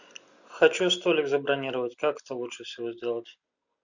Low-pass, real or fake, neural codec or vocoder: 7.2 kHz; real; none